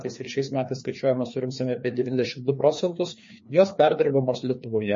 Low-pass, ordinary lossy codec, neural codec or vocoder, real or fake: 7.2 kHz; MP3, 32 kbps; codec, 16 kHz, 2 kbps, FreqCodec, larger model; fake